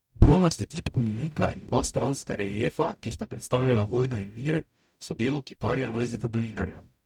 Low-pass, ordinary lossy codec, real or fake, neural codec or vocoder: 19.8 kHz; none; fake; codec, 44.1 kHz, 0.9 kbps, DAC